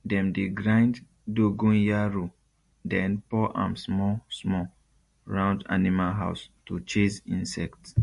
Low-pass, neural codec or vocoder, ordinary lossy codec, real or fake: 14.4 kHz; none; MP3, 48 kbps; real